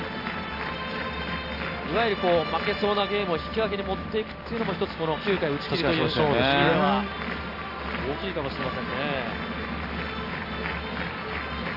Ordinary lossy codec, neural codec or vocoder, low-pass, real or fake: none; none; 5.4 kHz; real